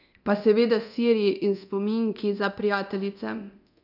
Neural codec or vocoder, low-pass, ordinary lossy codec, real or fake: codec, 24 kHz, 0.9 kbps, DualCodec; 5.4 kHz; none; fake